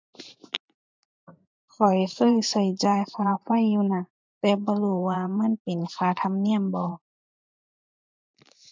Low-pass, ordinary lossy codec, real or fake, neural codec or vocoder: 7.2 kHz; MP3, 64 kbps; fake; vocoder, 44.1 kHz, 128 mel bands every 512 samples, BigVGAN v2